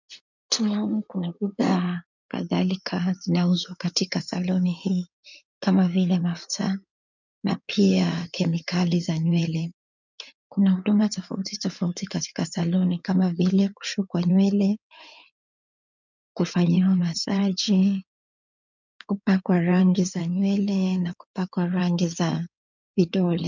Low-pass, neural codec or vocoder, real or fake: 7.2 kHz; codec, 16 kHz in and 24 kHz out, 2.2 kbps, FireRedTTS-2 codec; fake